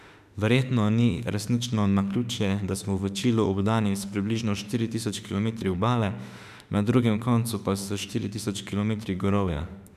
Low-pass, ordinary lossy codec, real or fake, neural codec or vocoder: 14.4 kHz; none; fake; autoencoder, 48 kHz, 32 numbers a frame, DAC-VAE, trained on Japanese speech